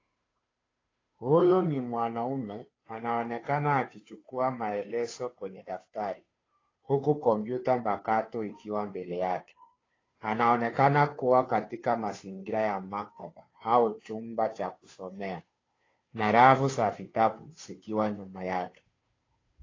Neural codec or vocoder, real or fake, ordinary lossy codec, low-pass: codec, 16 kHz, 2 kbps, FunCodec, trained on Chinese and English, 25 frames a second; fake; AAC, 32 kbps; 7.2 kHz